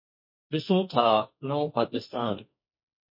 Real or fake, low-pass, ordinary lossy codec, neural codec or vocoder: fake; 5.4 kHz; MP3, 32 kbps; codec, 44.1 kHz, 2.6 kbps, SNAC